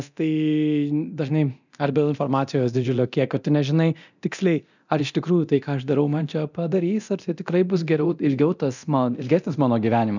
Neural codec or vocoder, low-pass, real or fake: codec, 24 kHz, 0.9 kbps, DualCodec; 7.2 kHz; fake